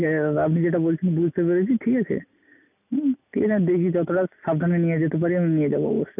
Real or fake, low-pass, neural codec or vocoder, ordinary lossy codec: real; 3.6 kHz; none; none